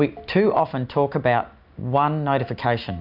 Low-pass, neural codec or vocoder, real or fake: 5.4 kHz; none; real